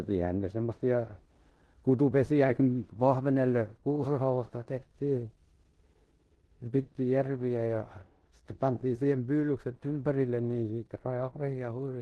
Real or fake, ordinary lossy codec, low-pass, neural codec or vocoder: fake; Opus, 16 kbps; 10.8 kHz; codec, 16 kHz in and 24 kHz out, 0.9 kbps, LongCat-Audio-Codec, four codebook decoder